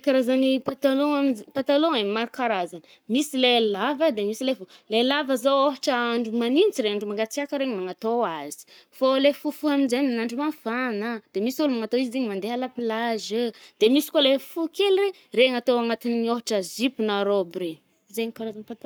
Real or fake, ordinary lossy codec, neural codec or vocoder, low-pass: fake; none; codec, 44.1 kHz, 7.8 kbps, Pupu-Codec; none